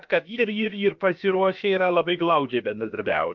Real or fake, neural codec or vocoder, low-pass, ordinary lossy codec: fake; codec, 16 kHz, about 1 kbps, DyCAST, with the encoder's durations; 7.2 kHz; MP3, 64 kbps